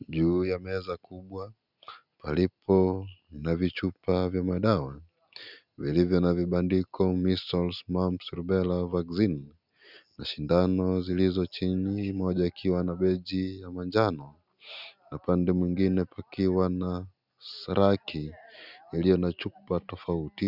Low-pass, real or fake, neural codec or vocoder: 5.4 kHz; real; none